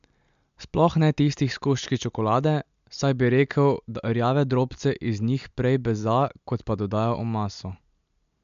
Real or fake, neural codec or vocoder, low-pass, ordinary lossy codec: real; none; 7.2 kHz; MP3, 64 kbps